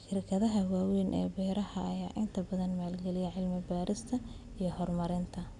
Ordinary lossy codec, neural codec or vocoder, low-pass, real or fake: none; none; 10.8 kHz; real